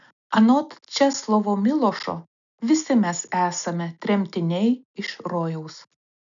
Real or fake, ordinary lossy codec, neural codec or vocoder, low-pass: real; MP3, 96 kbps; none; 7.2 kHz